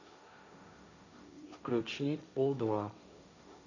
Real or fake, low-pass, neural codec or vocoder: fake; 7.2 kHz; codec, 16 kHz, 1.1 kbps, Voila-Tokenizer